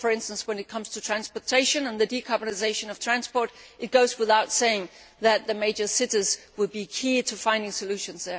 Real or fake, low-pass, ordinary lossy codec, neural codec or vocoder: real; none; none; none